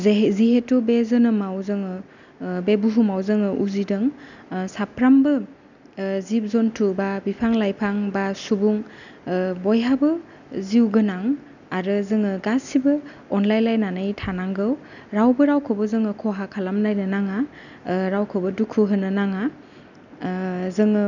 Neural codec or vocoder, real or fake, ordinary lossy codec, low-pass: none; real; none; 7.2 kHz